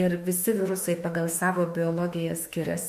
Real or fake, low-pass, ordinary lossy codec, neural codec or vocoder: fake; 14.4 kHz; MP3, 64 kbps; autoencoder, 48 kHz, 32 numbers a frame, DAC-VAE, trained on Japanese speech